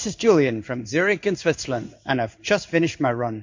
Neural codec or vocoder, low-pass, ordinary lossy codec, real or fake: codec, 16 kHz in and 24 kHz out, 1 kbps, XY-Tokenizer; 7.2 kHz; MP3, 48 kbps; fake